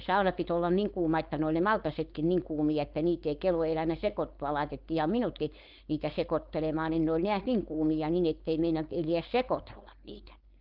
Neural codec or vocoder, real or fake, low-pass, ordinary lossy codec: codec, 16 kHz, 4.8 kbps, FACodec; fake; 5.4 kHz; Opus, 24 kbps